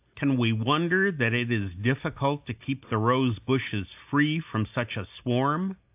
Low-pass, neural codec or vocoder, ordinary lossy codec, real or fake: 3.6 kHz; none; AAC, 32 kbps; real